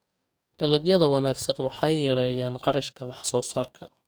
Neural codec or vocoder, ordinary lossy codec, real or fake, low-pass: codec, 44.1 kHz, 2.6 kbps, DAC; none; fake; none